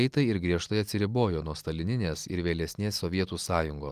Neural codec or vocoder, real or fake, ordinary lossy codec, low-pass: none; real; Opus, 32 kbps; 14.4 kHz